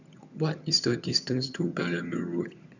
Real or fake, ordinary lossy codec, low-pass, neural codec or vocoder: fake; none; 7.2 kHz; vocoder, 22.05 kHz, 80 mel bands, HiFi-GAN